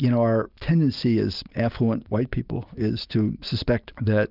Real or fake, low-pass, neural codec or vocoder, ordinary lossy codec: real; 5.4 kHz; none; Opus, 24 kbps